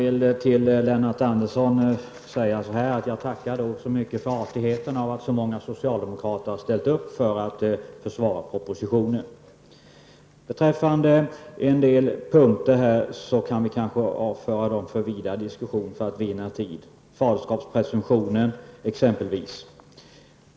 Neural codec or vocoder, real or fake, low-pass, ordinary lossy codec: none; real; none; none